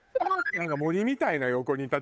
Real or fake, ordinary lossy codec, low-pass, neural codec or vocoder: fake; none; none; codec, 16 kHz, 8 kbps, FunCodec, trained on Chinese and English, 25 frames a second